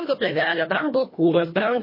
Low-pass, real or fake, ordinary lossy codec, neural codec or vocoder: 5.4 kHz; fake; MP3, 24 kbps; codec, 24 kHz, 1.5 kbps, HILCodec